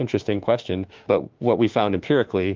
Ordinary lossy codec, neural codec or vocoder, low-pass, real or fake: Opus, 32 kbps; autoencoder, 48 kHz, 32 numbers a frame, DAC-VAE, trained on Japanese speech; 7.2 kHz; fake